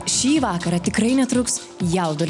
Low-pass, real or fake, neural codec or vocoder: 10.8 kHz; real; none